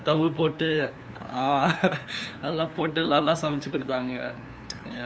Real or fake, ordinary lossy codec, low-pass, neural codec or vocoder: fake; none; none; codec, 16 kHz, 2 kbps, FunCodec, trained on LibriTTS, 25 frames a second